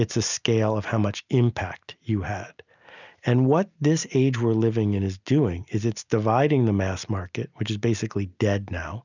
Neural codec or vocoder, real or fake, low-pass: none; real; 7.2 kHz